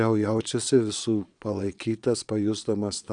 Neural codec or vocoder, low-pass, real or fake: vocoder, 22.05 kHz, 80 mel bands, Vocos; 9.9 kHz; fake